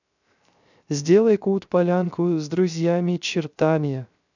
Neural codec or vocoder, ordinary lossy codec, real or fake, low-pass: codec, 16 kHz, 0.3 kbps, FocalCodec; none; fake; 7.2 kHz